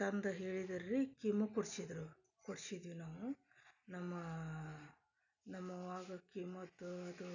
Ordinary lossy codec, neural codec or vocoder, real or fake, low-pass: none; none; real; 7.2 kHz